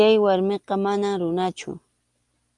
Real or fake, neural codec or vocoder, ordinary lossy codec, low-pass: real; none; Opus, 32 kbps; 10.8 kHz